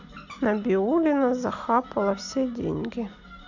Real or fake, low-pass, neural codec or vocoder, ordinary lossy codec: real; 7.2 kHz; none; none